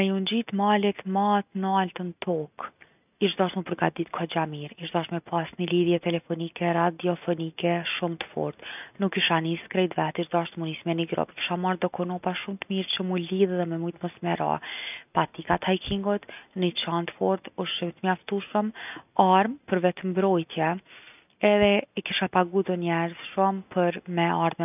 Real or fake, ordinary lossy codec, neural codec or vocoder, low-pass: real; none; none; 3.6 kHz